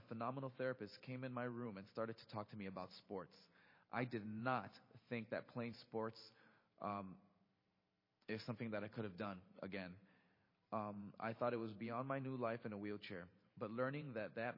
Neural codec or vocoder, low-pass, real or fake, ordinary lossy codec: vocoder, 44.1 kHz, 128 mel bands every 512 samples, BigVGAN v2; 5.4 kHz; fake; MP3, 24 kbps